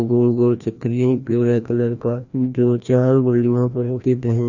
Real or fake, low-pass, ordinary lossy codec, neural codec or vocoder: fake; 7.2 kHz; none; codec, 16 kHz, 1 kbps, FreqCodec, larger model